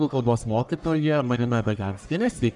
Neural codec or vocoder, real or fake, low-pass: codec, 44.1 kHz, 1.7 kbps, Pupu-Codec; fake; 10.8 kHz